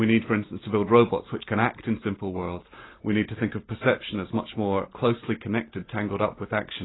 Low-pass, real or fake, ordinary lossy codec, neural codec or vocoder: 7.2 kHz; real; AAC, 16 kbps; none